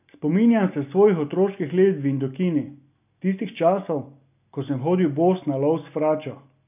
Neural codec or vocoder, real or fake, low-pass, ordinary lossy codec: none; real; 3.6 kHz; none